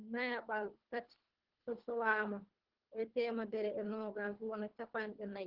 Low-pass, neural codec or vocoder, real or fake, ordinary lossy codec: 5.4 kHz; codec, 24 kHz, 3 kbps, HILCodec; fake; Opus, 16 kbps